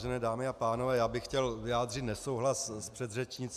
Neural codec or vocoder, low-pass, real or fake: none; 14.4 kHz; real